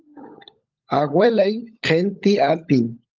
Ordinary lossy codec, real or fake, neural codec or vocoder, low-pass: Opus, 32 kbps; fake; codec, 16 kHz, 16 kbps, FunCodec, trained on LibriTTS, 50 frames a second; 7.2 kHz